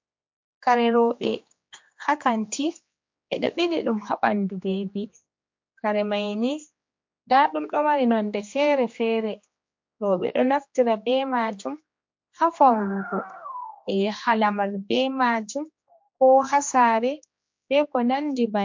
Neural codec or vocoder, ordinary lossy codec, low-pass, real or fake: codec, 16 kHz, 2 kbps, X-Codec, HuBERT features, trained on general audio; MP3, 48 kbps; 7.2 kHz; fake